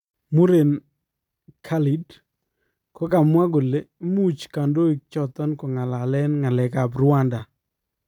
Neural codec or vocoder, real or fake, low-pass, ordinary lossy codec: vocoder, 44.1 kHz, 128 mel bands every 256 samples, BigVGAN v2; fake; 19.8 kHz; none